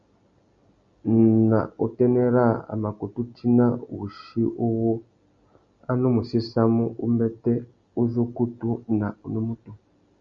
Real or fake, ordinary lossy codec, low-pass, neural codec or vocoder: real; Opus, 64 kbps; 7.2 kHz; none